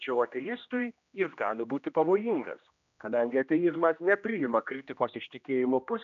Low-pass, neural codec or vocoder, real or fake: 7.2 kHz; codec, 16 kHz, 1 kbps, X-Codec, HuBERT features, trained on general audio; fake